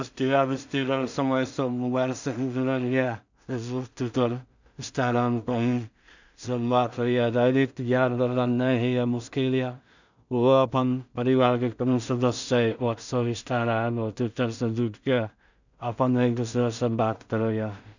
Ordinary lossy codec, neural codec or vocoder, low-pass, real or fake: none; codec, 16 kHz in and 24 kHz out, 0.4 kbps, LongCat-Audio-Codec, two codebook decoder; 7.2 kHz; fake